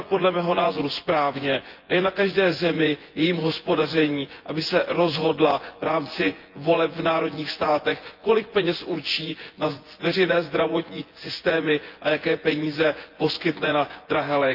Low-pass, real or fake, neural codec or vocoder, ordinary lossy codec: 5.4 kHz; fake; vocoder, 24 kHz, 100 mel bands, Vocos; Opus, 24 kbps